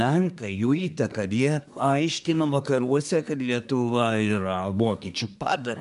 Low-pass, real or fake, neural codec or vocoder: 10.8 kHz; fake; codec, 24 kHz, 1 kbps, SNAC